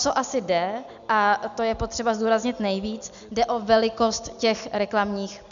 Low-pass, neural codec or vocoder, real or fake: 7.2 kHz; none; real